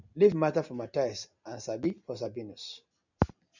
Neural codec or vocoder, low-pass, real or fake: none; 7.2 kHz; real